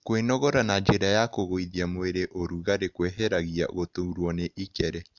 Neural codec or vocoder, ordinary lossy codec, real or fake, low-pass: none; none; real; 7.2 kHz